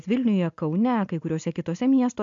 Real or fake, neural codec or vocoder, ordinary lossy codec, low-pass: real; none; AAC, 64 kbps; 7.2 kHz